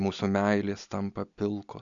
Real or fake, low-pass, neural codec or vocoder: real; 7.2 kHz; none